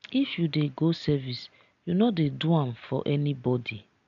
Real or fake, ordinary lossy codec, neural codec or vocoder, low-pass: real; none; none; 7.2 kHz